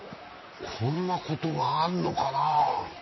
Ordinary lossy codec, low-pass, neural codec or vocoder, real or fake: MP3, 24 kbps; 7.2 kHz; vocoder, 44.1 kHz, 128 mel bands, Pupu-Vocoder; fake